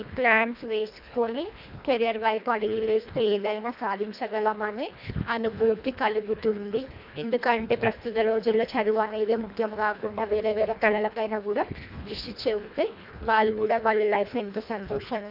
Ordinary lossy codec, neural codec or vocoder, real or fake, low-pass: none; codec, 24 kHz, 1.5 kbps, HILCodec; fake; 5.4 kHz